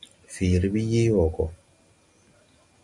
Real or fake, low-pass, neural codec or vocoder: real; 10.8 kHz; none